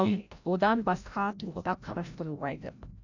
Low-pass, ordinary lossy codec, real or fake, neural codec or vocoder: 7.2 kHz; none; fake; codec, 16 kHz, 0.5 kbps, FreqCodec, larger model